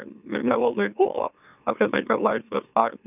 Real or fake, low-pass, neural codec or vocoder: fake; 3.6 kHz; autoencoder, 44.1 kHz, a latent of 192 numbers a frame, MeloTTS